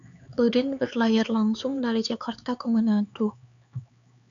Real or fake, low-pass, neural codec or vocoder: fake; 7.2 kHz; codec, 16 kHz, 4 kbps, X-Codec, HuBERT features, trained on LibriSpeech